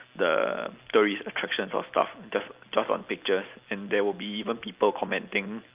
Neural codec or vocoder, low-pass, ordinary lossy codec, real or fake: none; 3.6 kHz; Opus, 32 kbps; real